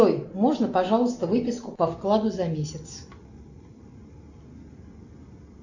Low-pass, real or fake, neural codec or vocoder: 7.2 kHz; real; none